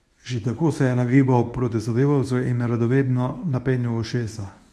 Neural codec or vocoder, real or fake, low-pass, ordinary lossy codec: codec, 24 kHz, 0.9 kbps, WavTokenizer, medium speech release version 1; fake; none; none